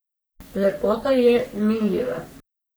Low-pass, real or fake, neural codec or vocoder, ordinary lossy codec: none; fake; codec, 44.1 kHz, 3.4 kbps, Pupu-Codec; none